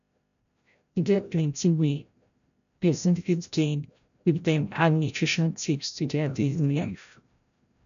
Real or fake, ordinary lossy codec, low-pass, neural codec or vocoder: fake; none; 7.2 kHz; codec, 16 kHz, 0.5 kbps, FreqCodec, larger model